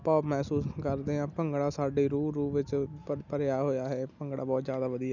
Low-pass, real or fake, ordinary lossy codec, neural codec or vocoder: 7.2 kHz; real; none; none